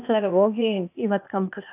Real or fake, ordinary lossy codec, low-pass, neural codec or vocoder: fake; AAC, 32 kbps; 3.6 kHz; codec, 16 kHz, 0.8 kbps, ZipCodec